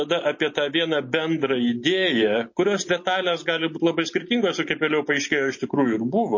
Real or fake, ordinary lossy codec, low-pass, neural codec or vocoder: real; MP3, 32 kbps; 7.2 kHz; none